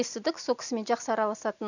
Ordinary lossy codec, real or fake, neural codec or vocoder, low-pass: none; real; none; 7.2 kHz